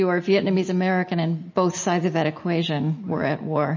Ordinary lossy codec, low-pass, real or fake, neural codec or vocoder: MP3, 32 kbps; 7.2 kHz; real; none